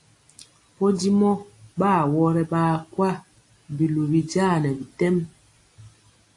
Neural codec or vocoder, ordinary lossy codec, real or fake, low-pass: none; AAC, 48 kbps; real; 10.8 kHz